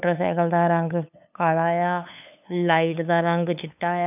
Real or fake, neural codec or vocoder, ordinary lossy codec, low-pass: fake; codec, 16 kHz, 8 kbps, FunCodec, trained on LibriTTS, 25 frames a second; none; 3.6 kHz